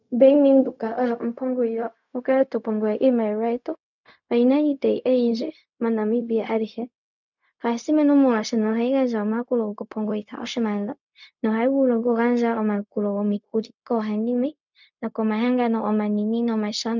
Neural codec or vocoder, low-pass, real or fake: codec, 16 kHz, 0.4 kbps, LongCat-Audio-Codec; 7.2 kHz; fake